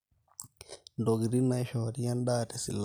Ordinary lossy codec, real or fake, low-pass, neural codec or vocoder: none; real; none; none